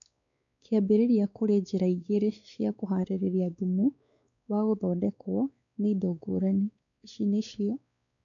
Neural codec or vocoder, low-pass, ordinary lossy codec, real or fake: codec, 16 kHz, 4 kbps, X-Codec, WavLM features, trained on Multilingual LibriSpeech; 7.2 kHz; none; fake